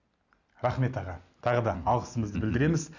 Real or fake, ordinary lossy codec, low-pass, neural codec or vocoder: real; none; 7.2 kHz; none